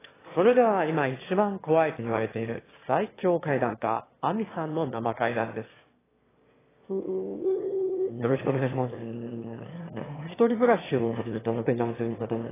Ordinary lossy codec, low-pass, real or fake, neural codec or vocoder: AAC, 16 kbps; 3.6 kHz; fake; autoencoder, 22.05 kHz, a latent of 192 numbers a frame, VITS, trained on one speaker